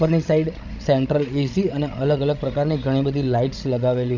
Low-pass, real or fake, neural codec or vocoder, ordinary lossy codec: 7.2 kHz; fake; codec, 16 kHz, 16 kbps, FreqCodec, larger model; none